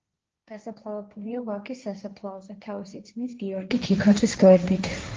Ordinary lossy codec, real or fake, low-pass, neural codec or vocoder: Opus, 24 kbps; fake; 7.2 kHz; codec, 16 kHz, 1.1 kbps, Voila-Tokenizer